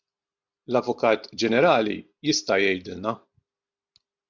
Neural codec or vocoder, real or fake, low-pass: none; real; 7.2 kHz